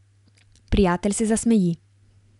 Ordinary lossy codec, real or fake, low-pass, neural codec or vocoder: none; real; 10.8 kHz; none